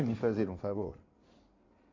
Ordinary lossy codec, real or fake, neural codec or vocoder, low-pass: MP3, 48 kbps; fake; codec, 16 kHz in and 24 kHz out, 2.2 kbps, FireRedTTS-2 codec; 7.2 kHz